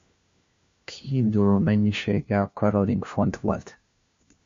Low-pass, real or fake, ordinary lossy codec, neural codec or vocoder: 7.2 kHz; fake; MP3, 48 kbps; codec, 16 kHz, 1 kbps, FunCodec, trained on LibriTTS, 50 frames a second